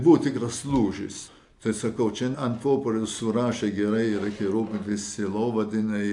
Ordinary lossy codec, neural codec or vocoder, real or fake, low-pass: AAC, 64 kbps; none; real; 10.8 kHz